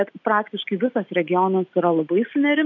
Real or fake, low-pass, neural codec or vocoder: real; 7.2 kHz; none